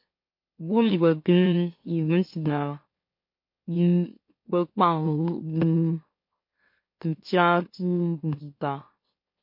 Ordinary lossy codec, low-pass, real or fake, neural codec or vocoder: MP3, 32 kbps; 5.4 kHz; fake; autoencoder, 44.1 kHz, a latent of 192 numbers a frame, MeloTTS